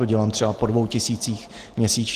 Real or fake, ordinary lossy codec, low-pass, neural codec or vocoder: real; Opus, 16 kbps; 14.4 kHz; none